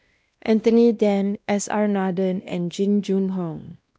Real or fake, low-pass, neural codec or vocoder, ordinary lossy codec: fake; none; codec, 16 kHz, 1 kbps, X-Codec, WavLM features, trained on Multilingual LibriSpeech; none